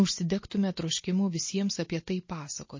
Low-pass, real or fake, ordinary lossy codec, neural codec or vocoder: 7.2 kHz; real; MP3, 32 kbps; none